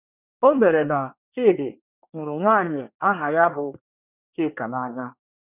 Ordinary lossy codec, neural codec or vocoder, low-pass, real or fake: none; codec, 24 kHz, 1 kbps, SNAC; 3.6 kHz; fake